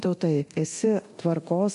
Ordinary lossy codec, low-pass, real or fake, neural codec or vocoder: MP3, 64 kbps; 10.8 kHz; fake; codec, 24 kHz, 0.9 kbps, DualCodec